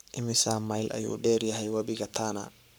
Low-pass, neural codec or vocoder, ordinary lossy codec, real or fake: none; codec, 44.1 kHz, 7.8 kbps, Pupu-Codec; none; fake